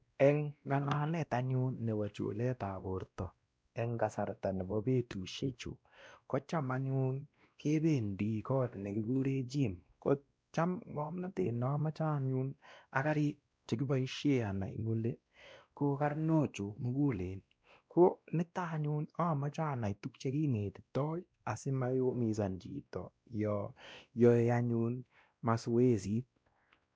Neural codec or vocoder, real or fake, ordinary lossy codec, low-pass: codec, 16 kHz, 1 kbps, X-Codec, WavLM features, trained on Multilingual LibriSpeech; fake; none; none